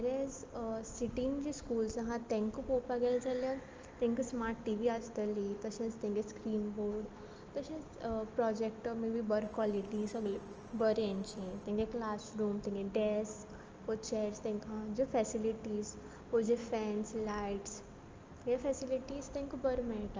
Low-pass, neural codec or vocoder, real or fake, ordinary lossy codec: 7.2 kHz; none; real; Opus, 24 kbps